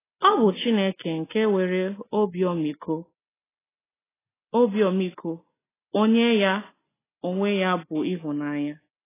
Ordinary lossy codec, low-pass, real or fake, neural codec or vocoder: AAC, 16 kbps; 3.6 kHz; real; none